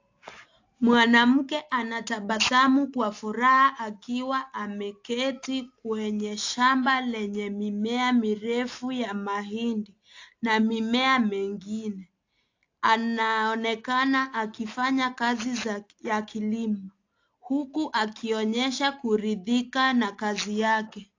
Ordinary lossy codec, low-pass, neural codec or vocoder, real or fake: AAC, 48 kbps; 7.2 kHz; none; real